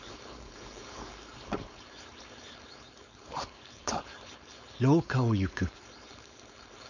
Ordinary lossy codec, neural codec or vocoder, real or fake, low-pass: none; codec, 16 kHz, 4.8 kbps, FACodec; fake; 7.2 kHz